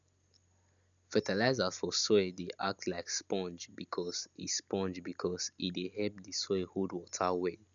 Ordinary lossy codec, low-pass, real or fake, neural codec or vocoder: none; 7.2 kHz; real; none